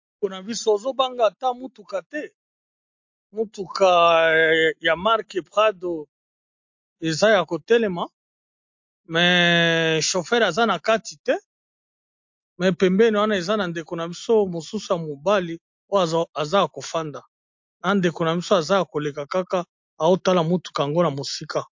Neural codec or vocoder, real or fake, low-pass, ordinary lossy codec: none; real; 7.2 kHz; MP3, 48 kbps